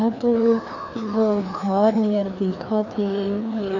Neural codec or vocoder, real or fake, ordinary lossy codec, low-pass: codec, 16 kHz, 2 kbps, FreqCodec, larger model; fake; none; 7.2 kHz